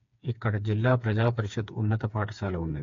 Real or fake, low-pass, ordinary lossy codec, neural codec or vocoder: fake; 7.2 kHz; AAC, 48 kbps; codec, 16 kHz, 4 kbps, FreqCodec, smaller model